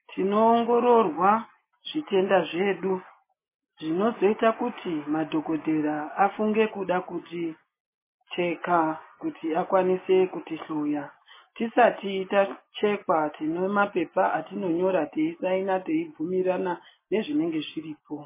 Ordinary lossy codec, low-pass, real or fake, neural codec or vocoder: MP3, 16 kbps; 3.6 kHz; real; none